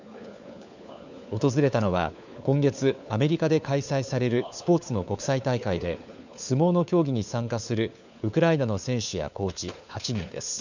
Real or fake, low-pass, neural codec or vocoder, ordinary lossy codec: fake; 7.2 kHz; codec, 16 kHz, 4 kbps, FunCodec, trained on LibriTTS, 50 frames a second; none